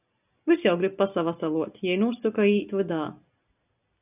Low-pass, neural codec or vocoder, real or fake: 3.6 kHz; none; real